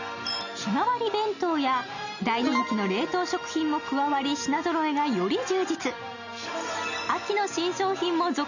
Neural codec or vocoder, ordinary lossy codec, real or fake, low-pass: none; none; real; 7.2 kHz